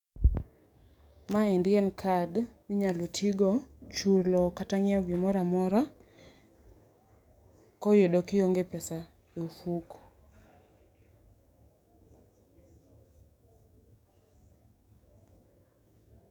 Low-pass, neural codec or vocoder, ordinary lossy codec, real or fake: 19.8 kHz; codec, 44.1 kHz, 7.8 kbps, DAC; none; fake